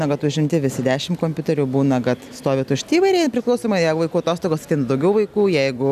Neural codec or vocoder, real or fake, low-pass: none; real; 14.4 kHz